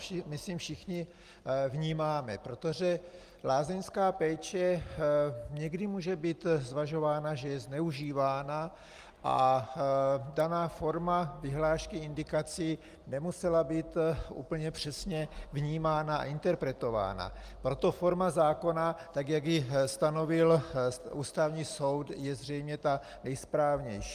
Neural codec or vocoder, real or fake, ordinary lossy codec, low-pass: none; real; Opus, 32 kbps; 14.4 kHz